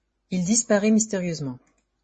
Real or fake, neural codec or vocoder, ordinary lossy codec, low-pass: fake; vocoder, 24 kHz, 100 mel bands, Vocos; MP3, 32 kbps; 10.8 kHz